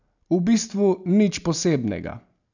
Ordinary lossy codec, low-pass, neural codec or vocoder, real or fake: none; 7.2 kHz; none; real